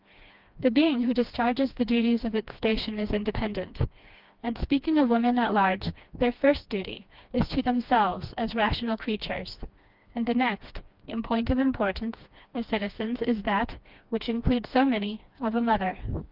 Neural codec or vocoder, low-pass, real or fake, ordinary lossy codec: codec, 16 kHz, 2 kbps, FreqCodec, smaller model; 5.4 kHz; fake; Opus, 24 kbps